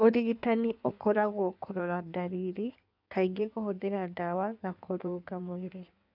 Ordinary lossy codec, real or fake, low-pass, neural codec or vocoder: none; fake; 5.4 kHz; codec, 16 kHz in and 24 kHz out, 1.1 kbps, FireRedTTS-2 codec